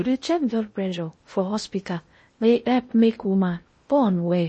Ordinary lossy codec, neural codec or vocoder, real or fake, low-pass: MP3, 32 kbps; codec, 16 kHz in and 24 kHz out, 0.6 kbps, FocalCodec, streaming, 2048 codes; fake; 10.8 kHz